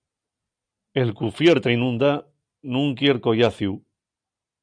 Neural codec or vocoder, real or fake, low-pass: none; real; 9.9 kHz